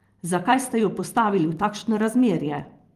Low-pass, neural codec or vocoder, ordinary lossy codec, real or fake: 14.4 kHz; vocoder, 44.1 kHz, 128 mel bands every 512 samples, BigVGAN v2; Opus, 24 kbps; fake